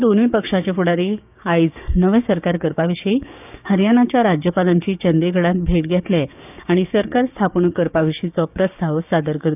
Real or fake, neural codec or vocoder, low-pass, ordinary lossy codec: fake; codec, 16 kHz, 6 kbps, DAC; 3.6 kHz; none